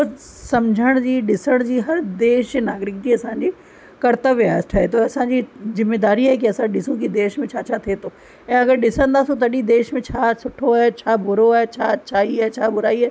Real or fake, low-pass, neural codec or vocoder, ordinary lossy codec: real; none; none; none